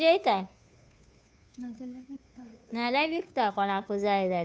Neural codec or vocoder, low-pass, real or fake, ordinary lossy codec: codec, 16 kHz, 2 kbps, FunCodec, trained on Chinese and English, 25 frames a second; none; fake; none